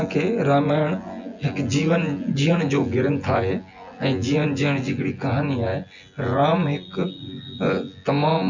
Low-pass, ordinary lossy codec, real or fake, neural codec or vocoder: 7.2 kHz; none; fake; vocoder, 24 kHz, 100 mel bands, Vocos